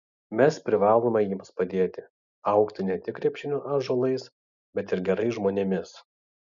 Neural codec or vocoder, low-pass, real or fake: none; 7.2 kHz; real